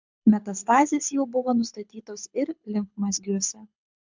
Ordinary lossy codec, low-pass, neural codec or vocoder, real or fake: MP3, 64 kbps; 7.2 kHz; codec, 24 kHz, 6 kbps, HILCodec; fake